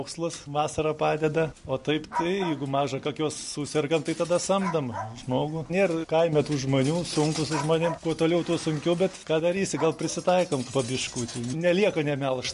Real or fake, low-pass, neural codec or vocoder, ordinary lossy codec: real; 14.4 kHz; none; MP3, 48 kbps